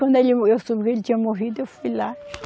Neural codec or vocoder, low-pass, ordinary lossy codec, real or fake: none; none; none; real